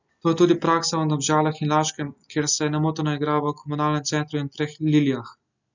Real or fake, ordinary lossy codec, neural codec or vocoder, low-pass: real; none; none; 7.2 kHz